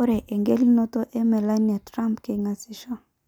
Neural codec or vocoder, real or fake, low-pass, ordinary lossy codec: none; real; 19.8 kHz; none